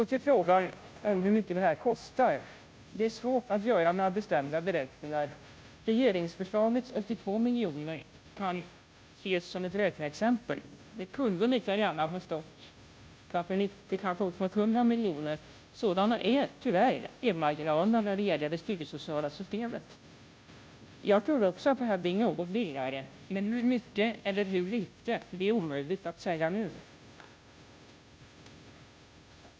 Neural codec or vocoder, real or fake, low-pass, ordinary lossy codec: codec, 16 kHz, 0.5 kbps, FunCodec, trained on Chinese and English, 25 frames a second; fake; none; none